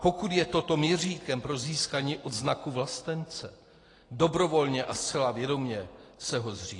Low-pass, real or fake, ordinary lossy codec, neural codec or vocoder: 10.8 kHz; real; AAC, 32 kbps; none